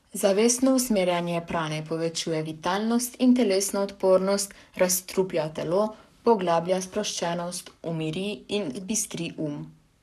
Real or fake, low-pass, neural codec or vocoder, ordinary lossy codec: fake; 14.4 kHz; codec, 44.1 kHz, 7.8 kbps, Pupu-Codec; none